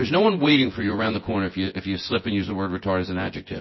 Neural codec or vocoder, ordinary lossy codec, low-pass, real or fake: vocoder, 24 kHz, 100 mel bands, Vocos; MP3, 24 kbps; 7.2 kHz; fake